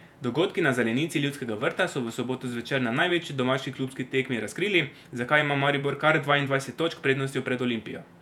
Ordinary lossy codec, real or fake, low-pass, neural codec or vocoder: none; fake; 19.8 kHz; vocoder, 48 kHz, 128 mel bands, Vocos